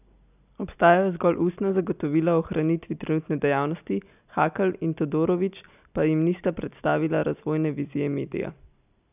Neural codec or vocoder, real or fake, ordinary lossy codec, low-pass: none; real; none; 3.6 kHz